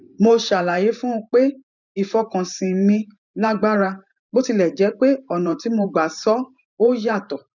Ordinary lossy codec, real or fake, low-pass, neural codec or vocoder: none; fake; 7.2 kHz; vocoder, 44.1 kHz, 128 mel bands every 512 samples, BigVGAN v2